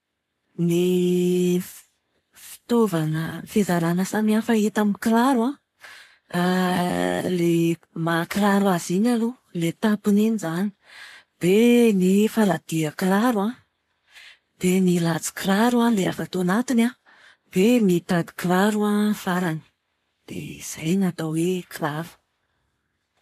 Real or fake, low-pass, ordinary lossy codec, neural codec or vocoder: fake; 14.4 kHz; none; codec, 44.1 kHz, 7.8 kbps, Pupu-Codec